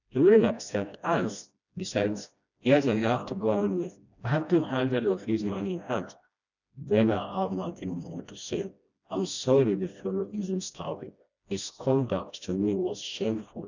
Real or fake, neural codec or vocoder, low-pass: fake; codec, 16 kHz, 1 kbps, FreqCodec, smaller model; 7.2 kHz